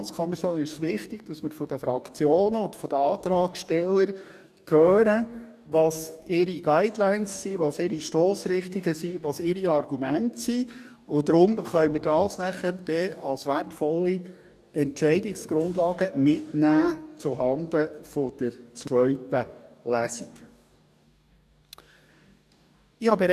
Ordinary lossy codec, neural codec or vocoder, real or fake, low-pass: none; codec, 44.1 kHz, 2.6 kbps, DAC; fake; 14.4 kHz